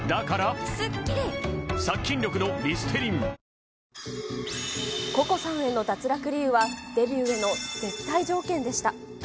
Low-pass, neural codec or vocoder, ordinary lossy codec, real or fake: none; none; none; real